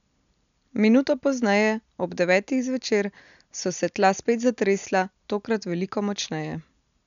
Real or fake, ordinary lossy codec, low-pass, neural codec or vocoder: real; none; 7.2 kHz; none